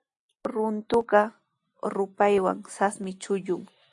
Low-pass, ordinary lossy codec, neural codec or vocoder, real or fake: 10.8 kHz; AAC, 64 kbps; none; real